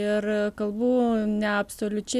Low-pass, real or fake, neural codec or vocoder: 14.4 kHz; real; none